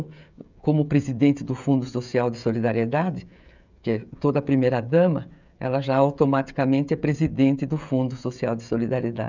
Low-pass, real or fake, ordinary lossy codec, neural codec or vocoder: 7.2 kHz; fake; none; codec, 16 kHz, 16 kbps, FreqCodec, smaller model